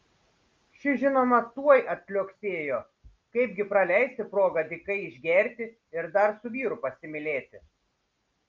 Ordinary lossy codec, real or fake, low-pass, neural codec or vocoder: Opus, 24 kbps; real; 7.2 kHz; none